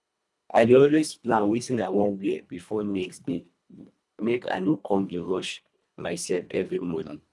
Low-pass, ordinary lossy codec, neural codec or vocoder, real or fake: none; none; codec, 24 kHz, 1.5 kbps, HILCodec; fake